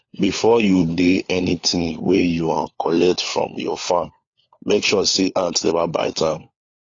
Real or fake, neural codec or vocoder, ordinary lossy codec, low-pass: fake; codec, 16 kHz, 4 kbps, FunCodec, trained on LibriTTS, 50 frames a second; AAC, 32 kbps; 7.2 kHz